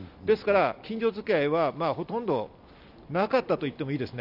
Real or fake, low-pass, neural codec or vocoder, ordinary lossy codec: real; 5.4 kHz; none; none